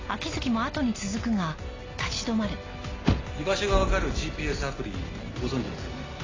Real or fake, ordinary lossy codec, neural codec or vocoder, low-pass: real; AAC, 32 kbps; none; 7.2 kHz